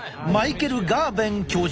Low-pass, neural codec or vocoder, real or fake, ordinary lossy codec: none; none; real; none